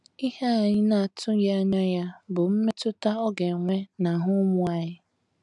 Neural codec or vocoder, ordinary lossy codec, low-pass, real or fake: none; none; 10.8 kHz; real